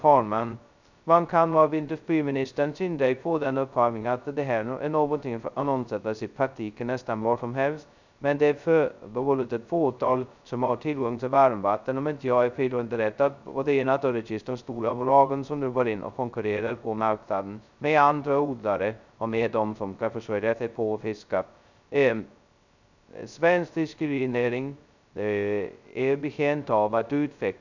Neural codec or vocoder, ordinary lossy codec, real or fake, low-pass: codec, 16 kHz, 0.2 kbps, FocalCodec; none; fake; 7.2 kHz